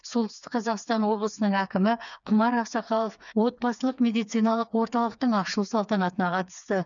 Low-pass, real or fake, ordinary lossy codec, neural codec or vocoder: 7.2 kHz; fake; none; codec, 16 kHz, 4 kbps, FreqCodec, smaller model